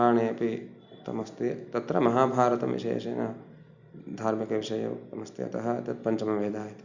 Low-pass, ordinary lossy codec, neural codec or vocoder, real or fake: 7.2 kHz; none; none; real